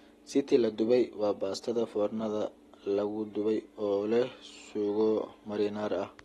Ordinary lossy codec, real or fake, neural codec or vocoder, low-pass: AAC, 32 kbps; real; none; 14.4 kHz